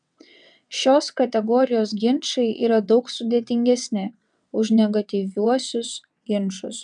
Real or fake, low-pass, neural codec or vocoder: fake; 9.9 kHz; vocoder, 22.05 kHz, 80 mel bands, WaveNeXt